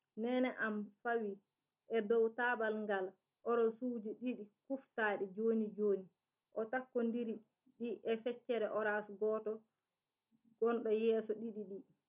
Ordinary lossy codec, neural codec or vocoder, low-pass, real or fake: none; none; 3.6 kHz; real